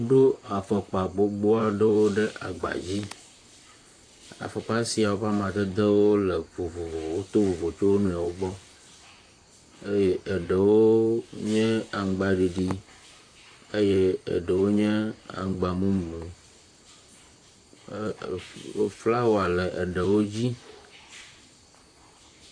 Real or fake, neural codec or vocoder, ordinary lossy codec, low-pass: fake; vocoder, 44.1 kHz, 128 mel bands, Pupu-Vocoder; AAC, 48 kbps; 9.9 kHz